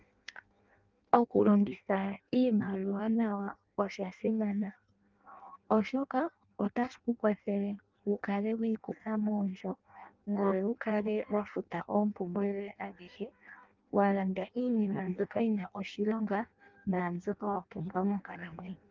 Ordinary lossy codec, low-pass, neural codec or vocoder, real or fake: Opus, 32 kbps; 7.2 kHz; codec, 16 kHz in and 24 kHz out, 0.6 kbps, FireRedTTS-2 codec; fake